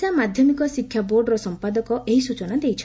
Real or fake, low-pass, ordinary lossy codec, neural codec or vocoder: real; none; none; none